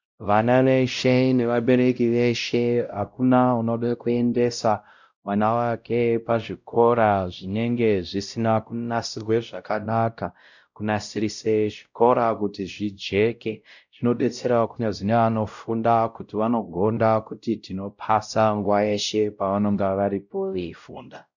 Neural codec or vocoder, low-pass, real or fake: codec, 16 kHz, 0.5 kbps, X-Codec, WavLM features, trained on Multilingual LibriSpeech; 7.2 kHz; fake